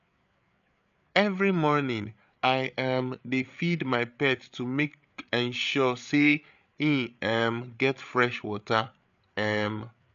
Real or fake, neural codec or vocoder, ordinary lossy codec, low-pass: fake; codec, 16 kHz, 8 kbps, FreqCodec, larger model; none; 7.2 kHz